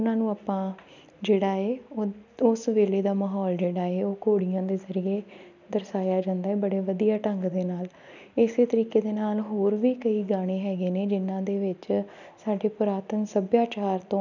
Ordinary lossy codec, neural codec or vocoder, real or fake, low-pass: none; none; real; 7.2 kHz